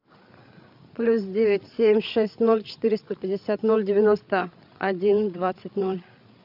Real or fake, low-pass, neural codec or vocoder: fake; 5.4 kHz; codec, 16 kHz, 8 kbps, FreqCodec, larger model